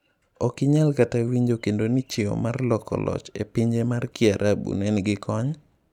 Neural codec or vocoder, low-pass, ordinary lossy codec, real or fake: vocoder, 44.1 kHz, 128 mel bands every 256 samples, BigVGAN v2; 19.8 kHz; none; fake